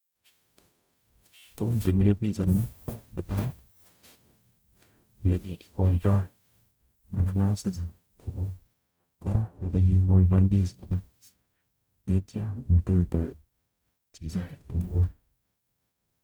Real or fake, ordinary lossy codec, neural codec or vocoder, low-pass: fake; none; codec, 44.1 kHz, 0.9 kbps, DAC; none